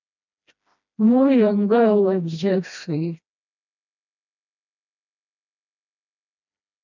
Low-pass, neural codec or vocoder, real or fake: 7.2 kHz; codec, 16 kHz, 1 kbps, FreqCodec, smaller model; fake